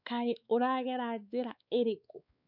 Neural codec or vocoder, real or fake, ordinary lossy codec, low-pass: codec, 16 kHz, 4 kbps, X-Codec, WavLM features, trained on Multilingual LibriSpeech; fake; none; 5.4 kHz